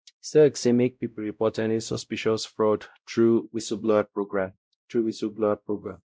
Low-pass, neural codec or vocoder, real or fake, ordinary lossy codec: none; codec, 16 kHz, 0.5 kbps, X-Codec, WavLM features, trained on Multilingual LibriSpeech; fake; none